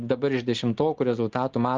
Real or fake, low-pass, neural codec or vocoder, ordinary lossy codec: real; 7.2 kHz; none; Opus, 32 kbps